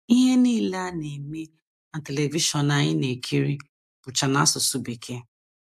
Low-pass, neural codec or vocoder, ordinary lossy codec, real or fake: 14.4 kHz; none; AAC, 96 kbps; real